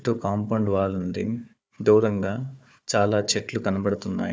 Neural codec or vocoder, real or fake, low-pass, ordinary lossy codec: codec, 16 kHz, 4 kbps, FunCodec, trained on Chinese and English, 50 frames a second; fake; none; none